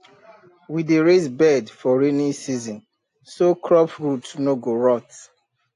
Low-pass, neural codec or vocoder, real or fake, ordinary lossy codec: 10.8 kHz; none; real; AAC, 48 kbps